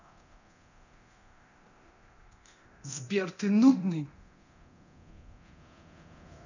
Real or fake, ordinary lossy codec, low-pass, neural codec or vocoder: fake; none; 7.2 kHz; codec, 24 kHz, 0.9 kbps, DualCodec